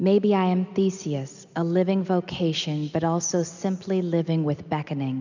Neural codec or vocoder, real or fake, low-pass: none; real; 7.2 kHz